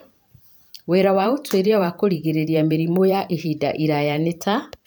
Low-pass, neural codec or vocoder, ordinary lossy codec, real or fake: none; vocoder, 44.1 kHz, 128 mel bands every 512 samples, BigVGAN v2; none; fake